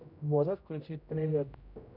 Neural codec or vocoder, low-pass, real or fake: codec, 16 kHz, 0.5 kbps, X-Codec, HuBERT features, trained on general audio; 5.4 kHz; fake